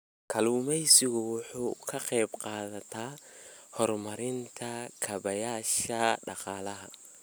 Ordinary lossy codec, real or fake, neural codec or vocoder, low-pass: none; real; none; none